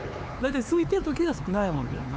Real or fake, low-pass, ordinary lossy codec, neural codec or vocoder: fake; none; none; codec, 16 kHz, 4 kbps, X-Codec, HuBERT features, trained on LibriSpeech